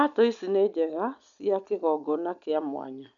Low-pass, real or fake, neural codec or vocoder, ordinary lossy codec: 7.2 kHz; real; none; none